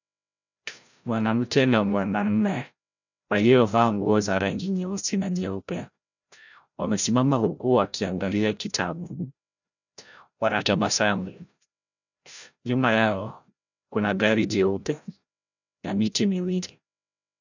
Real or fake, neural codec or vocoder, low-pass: fake; codec, 16 kHz, 0.5 kbps, FreqCodec, larger model; 7.2 kHz